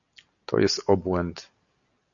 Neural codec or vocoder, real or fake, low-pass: none; real; 7.2 kHz